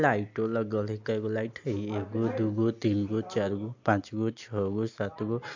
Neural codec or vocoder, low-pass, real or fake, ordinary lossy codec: none; 7.2 kHz; real; AAC, 48 kbps